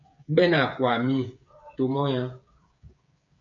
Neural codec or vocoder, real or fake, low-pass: codec, 16 kHz, 8 kbps, FreqCodec, smaller model; fake; 7.2 kHz